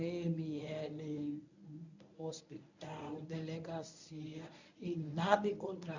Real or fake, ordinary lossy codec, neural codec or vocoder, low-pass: fake; none; codec, 24 kHz, 0.9 kbps, WavTokenizer, medium speech release version 1; 7.2 kHz